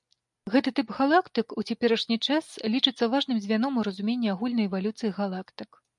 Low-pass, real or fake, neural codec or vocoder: 10.8 kHz; real; none